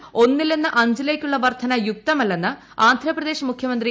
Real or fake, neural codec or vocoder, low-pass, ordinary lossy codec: real; none; none; none